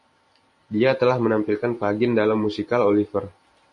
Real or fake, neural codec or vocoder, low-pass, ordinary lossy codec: fake; vocoder, 44.1 kHz, 128 mel bands every 512 samples, BigVGAN v2; 10.8 kHz; MP3, 48 kbps